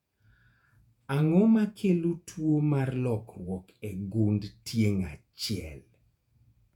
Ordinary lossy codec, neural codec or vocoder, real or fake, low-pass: none; vocoder, 48 kHz, 128 mel bands, Vocos; fake; 19.8 kHz